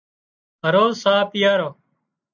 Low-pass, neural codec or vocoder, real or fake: 7.2 kHz; none; real